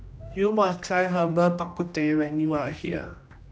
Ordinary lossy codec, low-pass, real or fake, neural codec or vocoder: none; none; fake; codec, 16 kHz, 1 kbps, X-Codec, HuBERT features, trained on general audio